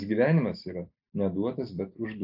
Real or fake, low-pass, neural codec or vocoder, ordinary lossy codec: real; 5.4 kHz; none; AAC, 48 kbps